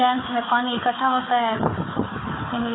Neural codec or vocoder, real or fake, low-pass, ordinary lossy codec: codec, 16 kHz, 4 kbps, FunCodec, trained on Chinese and English, 50 frames a second; fake; 7.2 kHz; AAC, 16 kbps